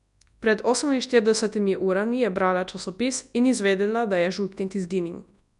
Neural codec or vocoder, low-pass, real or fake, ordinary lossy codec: codec, 24 kHz, 0.9 kbps, WavTokenizer, large speech release; 10.8 kHz; fake; none